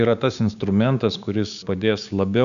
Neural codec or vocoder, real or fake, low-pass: codec, 16 kHz, 6 kbps, DAC; fake; 7.2 kHz